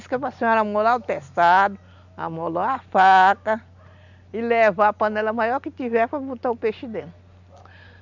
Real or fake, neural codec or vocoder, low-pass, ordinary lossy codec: real; none; 7.2 kHz; none